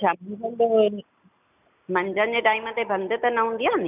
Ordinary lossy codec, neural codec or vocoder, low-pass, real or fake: none; none; 3.6 kHz; real